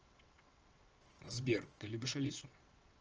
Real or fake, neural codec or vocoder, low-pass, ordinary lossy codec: fake; vocoder, 22.05 kHz, 80 mel bands, WaveNeXt; 7.2 kHz; Opus, 24 kbps